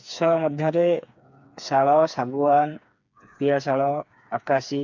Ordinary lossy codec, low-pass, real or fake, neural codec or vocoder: none; 7.2 kHz; fake; codec, 16 kHz, 4 kbps, FreqCodec, smaller model